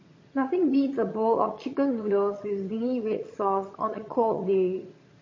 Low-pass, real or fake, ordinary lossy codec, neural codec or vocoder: 7.2 kHz; fake; MP3, 32 kbps; vocoder, 22.05 kHz, 80 mel bands, HiFi-GAN